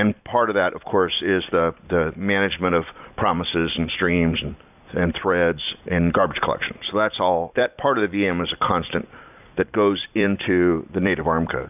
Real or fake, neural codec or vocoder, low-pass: fake; vocoder, 44.1 kHz, 128 mel bands every 256 samples, BigVGAN v2; 3.6 kHz